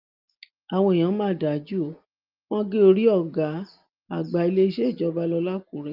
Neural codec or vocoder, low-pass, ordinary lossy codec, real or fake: none; 5.4 kHz; Opus, 32 kbps; real